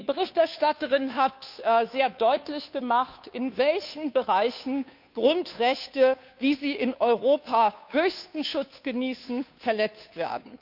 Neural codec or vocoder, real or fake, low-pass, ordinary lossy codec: codec, 16 kHz, 2 kbps, FunCodec, trained on Chinese and English, 25 frames a second; fake; 5.4 kHz; none